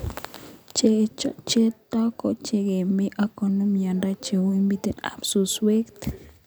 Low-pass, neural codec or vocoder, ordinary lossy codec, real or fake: none; none; none; real